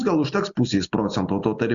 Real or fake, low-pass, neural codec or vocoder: real; 7.2 kHz; none